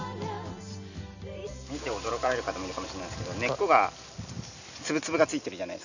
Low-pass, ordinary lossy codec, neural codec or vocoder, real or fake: 7.2 kHz; none; none; real